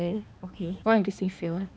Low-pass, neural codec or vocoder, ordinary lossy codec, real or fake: none; codec, 16 kHz, 2 kbps, X-Codec, HuBERT features, trained on balanced general audio; none; fake